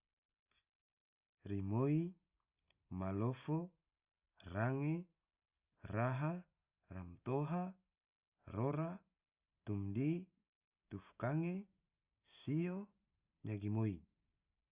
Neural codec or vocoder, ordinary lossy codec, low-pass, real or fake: none; Opus, 64 kbps; 3.6 kHz; real